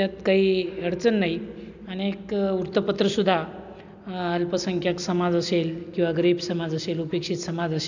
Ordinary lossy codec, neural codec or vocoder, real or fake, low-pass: none; none; real; 7.2 kHz